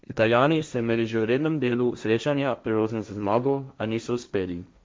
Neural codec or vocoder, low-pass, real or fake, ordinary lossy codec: codec, 16 kHz, 1.1 kbps, Voila-Tokenizer; none; fake; none